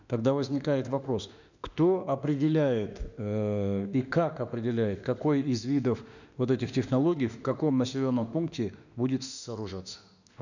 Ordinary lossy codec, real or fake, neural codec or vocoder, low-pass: none; fake; autoencoder, 48 kHz, 32 numbers a frame, DAC-VAE, trained on Japanese speech; 7.2 kHz